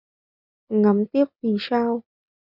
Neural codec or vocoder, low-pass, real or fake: none; 5.4 kHz; real